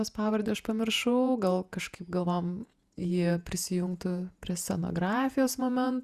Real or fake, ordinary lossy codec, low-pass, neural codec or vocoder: fake; Opus, 64 kbps; 14.4 kHz; vocoder, 48 kHz, 128 mel bands, Vocos